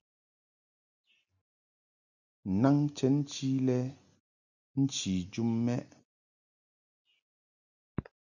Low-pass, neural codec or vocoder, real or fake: 7.2 kHz; none; real